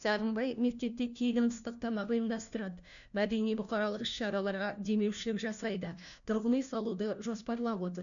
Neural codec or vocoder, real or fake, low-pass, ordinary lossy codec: codec, 16 kHz, 1 kbps, FunCodec, trained on LibriTTS, 50 frames a second; fake; 7.2 kHz; none